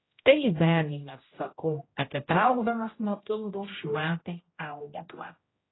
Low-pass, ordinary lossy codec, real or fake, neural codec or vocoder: 7.2 kHz; AAC, 16 kbps; fake; codec, 16 kHz, 0.5 kbps, X-Codec, HuBERT features, trained on general audio